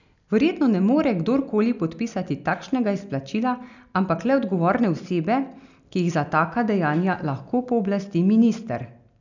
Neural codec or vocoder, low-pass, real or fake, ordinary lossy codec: none; 7.2 kHz; real; none